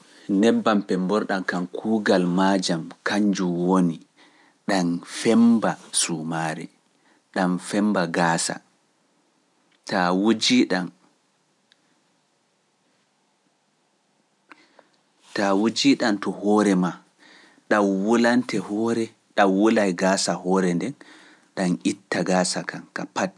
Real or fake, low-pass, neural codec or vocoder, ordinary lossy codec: real; none; none; none